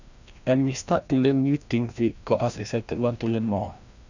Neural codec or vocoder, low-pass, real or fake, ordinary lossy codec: codec, 16 kHz, 1 kbps, FreqCodec, larger model; 7.2 kHz; fake; none